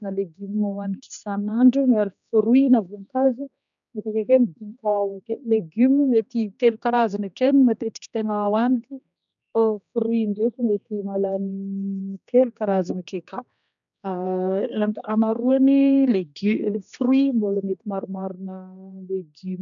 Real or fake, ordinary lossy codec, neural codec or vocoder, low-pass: fake; none; codec, 16 kHz, 2 kbps, X-Codec, HuBERT features, trained on general audio; 7.2 kHz